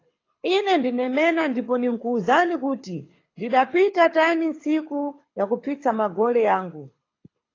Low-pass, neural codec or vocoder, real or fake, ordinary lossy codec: 7.2 kHz; codec, 24 kHz, 6 kbps, HILCodec; fake; AAC, 32 kbps